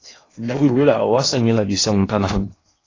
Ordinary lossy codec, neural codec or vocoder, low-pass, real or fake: AAC, 32 kbps; codec, 16 kHz in and 24 kHz out, 0.8 kbps, FocalCodec, streaming, 65536 codes; 7.2 kHz; fake